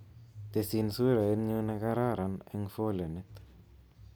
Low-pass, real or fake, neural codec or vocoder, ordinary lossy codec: none; real; none; none